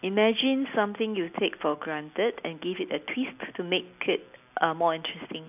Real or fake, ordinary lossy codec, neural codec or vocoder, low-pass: real; none; none; 3.6 kHz